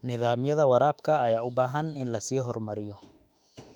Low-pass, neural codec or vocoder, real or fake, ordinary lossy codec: 19.8 kHz; autoencoder, 48 kHz, 32 numbers a frame, DAC-VAE, trained on Japanese speech; fake; none